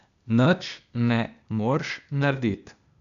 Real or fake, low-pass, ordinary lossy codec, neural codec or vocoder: fake; 7.2 kHz; none; codec, 16 kHz, 0.8 kbps, ZipCodec